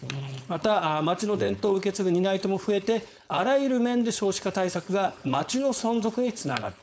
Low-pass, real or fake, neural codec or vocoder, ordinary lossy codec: none; fake; codec, 16 kHz, 4.8 kbps, FACodec; none